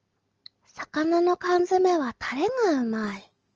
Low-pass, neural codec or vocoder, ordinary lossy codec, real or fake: 7.2 kHz; none; Opus, 24 kbps; real